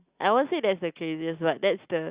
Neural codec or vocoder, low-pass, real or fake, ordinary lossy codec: none; 3.6 kHz; real; Opus, 64 kbps